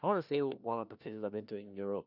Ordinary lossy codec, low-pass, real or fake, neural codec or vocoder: none; 5.4 kHz; fake; codec, 16 kHz, 1 kbps, FunCodec, trained on LibriTTS, 50 frames a second